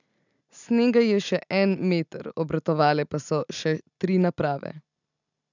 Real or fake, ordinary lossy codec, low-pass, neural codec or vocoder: real; none; 7.2 kHz; none